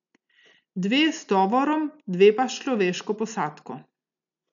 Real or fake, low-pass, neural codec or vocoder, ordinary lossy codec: real; 7.2 kHz; none; none